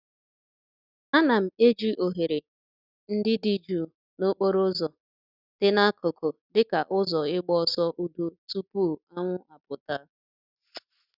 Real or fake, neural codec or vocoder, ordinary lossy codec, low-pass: real; none; none; 5.4 kHz